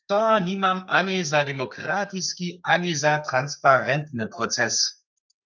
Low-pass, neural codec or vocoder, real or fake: 7.2 kHz; codec, 32 kHz, 1.9 kbps, SNAC; fake